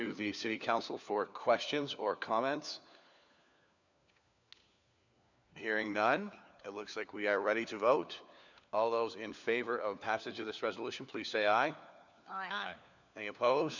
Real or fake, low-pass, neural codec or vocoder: fake; 7.2 kHz; codec, 16 kHz, 4 kbps, FunCodec, trained on LibriTTS, 50 frames a second